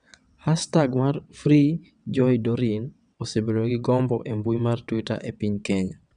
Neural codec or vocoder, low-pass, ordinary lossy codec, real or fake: vocoder, 22.05 kHz, 80 mel bands, WaveNeXt; 9.9 kHz; none; fake